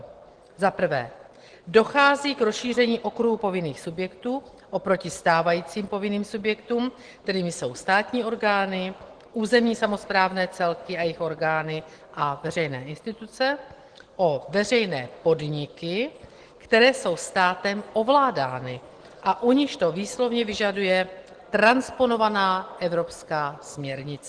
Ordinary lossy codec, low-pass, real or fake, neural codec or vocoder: Opus, 16 kbps; 9.9 kHz; real; none